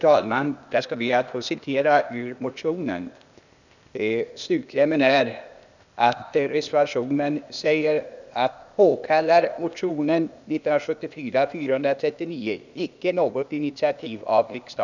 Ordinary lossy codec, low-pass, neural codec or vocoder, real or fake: none; 7.2 kHz; codec, 16 kHz, 0.8 kbps, ZipCodec; fake